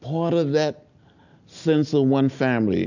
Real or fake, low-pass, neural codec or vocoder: real; 7.2 kHz; none